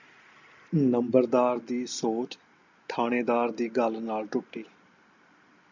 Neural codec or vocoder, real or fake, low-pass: none; real; 7.2 kHz